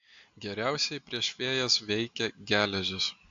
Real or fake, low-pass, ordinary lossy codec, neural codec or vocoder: real; 7.2 kHz; AAC, 64 kbps; none